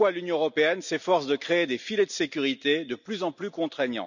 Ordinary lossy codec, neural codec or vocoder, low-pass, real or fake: none; none; 7.2 kHz; real